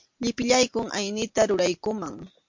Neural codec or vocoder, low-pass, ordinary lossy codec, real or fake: none; 7.2 kHz; MP3, 48 kbps; real